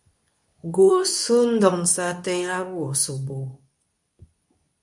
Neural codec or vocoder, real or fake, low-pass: codec, 24 kHz, 0.9 kbps, WavTokenizer, medium speech release version 2; fake; 10.8 kHz